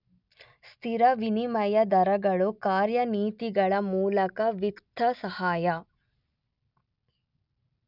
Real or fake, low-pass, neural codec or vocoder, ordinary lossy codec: real; 5.4 kHz; none; none